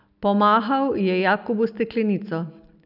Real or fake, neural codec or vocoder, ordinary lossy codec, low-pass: real; none; none; 5.4 kHz